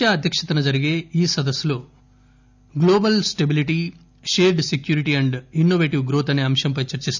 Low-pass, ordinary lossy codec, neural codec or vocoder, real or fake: 7.2 kHz; none; none; real